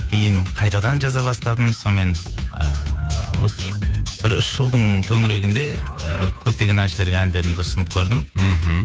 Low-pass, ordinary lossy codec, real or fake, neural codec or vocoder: none; none; fake; codec, 16 kHz, 2 kbps, FunCodec, trained on Chinese and English, 25 frames a second